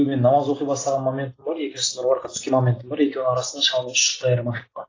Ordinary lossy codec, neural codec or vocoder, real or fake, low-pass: AAC, 32 kbps; none; real; 7.2 kHz